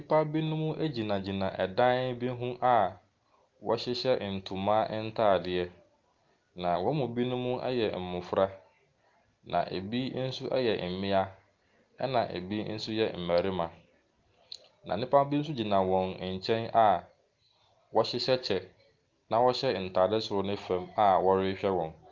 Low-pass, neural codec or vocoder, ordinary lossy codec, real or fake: 7.2 kHz; none; Opus, 32 kbps; real